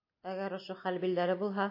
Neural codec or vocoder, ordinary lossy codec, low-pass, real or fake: none; MP3, 32 kbps; 5.4 kHz; real